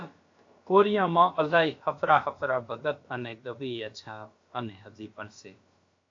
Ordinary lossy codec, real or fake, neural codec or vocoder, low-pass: AAC, 48 kbps; fake; codec, 16 kHz, about 1 kbps, DyCAST, with the encoder's durations; 7.2 kHz